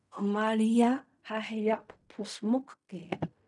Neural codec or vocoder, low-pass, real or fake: codec, 16 kHz in and 24 kHz out, 0.4 kbps, LongCat-Audio-Codec, fine tuned four codebook decoder; 10.8 kHz; fake